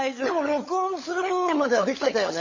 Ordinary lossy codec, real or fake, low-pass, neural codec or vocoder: MP3, 32 kbps; fake; 7.2 kHz; codec, 16 kHz, 16 kbps, FunCodec, trained on LibriTTS, 50 frames a second